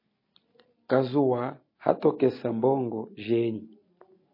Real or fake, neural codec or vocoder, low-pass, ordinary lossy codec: real; none; 5.4 kHz; MP3, 24 kbps